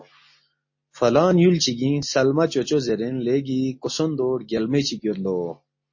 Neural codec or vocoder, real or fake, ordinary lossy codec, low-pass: none; real; MP3, 32 kbps; 7.2 kHz